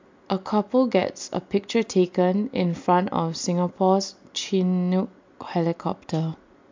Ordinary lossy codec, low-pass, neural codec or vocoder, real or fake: MP3, 64 kbps; 7.2 kHz; none; real